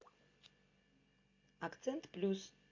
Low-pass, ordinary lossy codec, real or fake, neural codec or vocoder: 7.2 kHz; MP3, 48 kbps; real; none